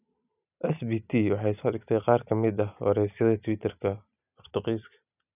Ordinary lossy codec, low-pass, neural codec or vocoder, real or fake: none; 3.6 kHz; none; real